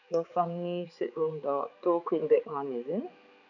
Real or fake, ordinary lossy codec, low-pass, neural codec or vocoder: fake; none; 7.2 kHz; codec, 16 kHz, 4 kbps, X-Codec, HuBERT features, trained on balanced general audio